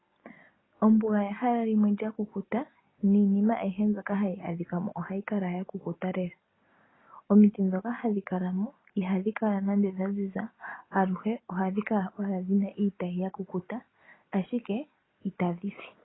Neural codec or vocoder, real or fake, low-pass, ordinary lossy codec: none; real; 7.2 kHz; AAC, 16 kbps